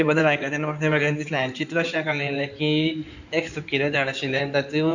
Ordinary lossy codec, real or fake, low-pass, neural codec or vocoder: none; fake; 7.2 kHz; codec, 16 kHz in and 24 kHz out, 2.2 kbps, FireRedTTS-2 codec